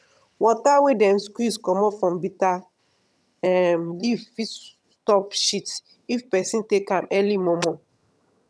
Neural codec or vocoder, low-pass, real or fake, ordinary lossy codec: vocoder, 22.05 kHz, 80 mel bands, HiFi-GAN; none; fake; none